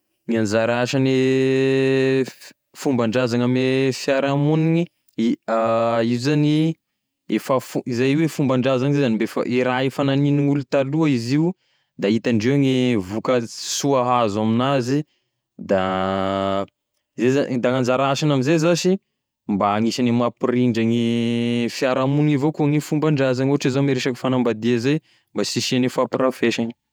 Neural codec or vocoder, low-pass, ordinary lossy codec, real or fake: vocoder, 48 kHz, 128 mel bands, Vocos; none; none; fake